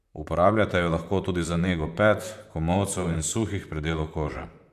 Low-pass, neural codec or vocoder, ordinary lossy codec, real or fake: 14.4 kHz; vocoder, 44.1 kHz, 128 mel bands, Pupu-Vocoder; MP3, 96 kbps; fake